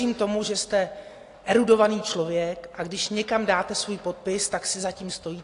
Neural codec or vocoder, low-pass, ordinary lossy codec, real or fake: none; 10.8 kHz; AAC, 48 kbps; real